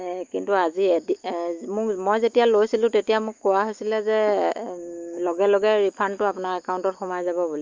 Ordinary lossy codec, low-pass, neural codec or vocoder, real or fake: Opus, 32 kbps; 7.2 kHz; autoencoder, 48 kHz, 128 numbers a frame, DAC-VAE, trained on Japanese speech; fake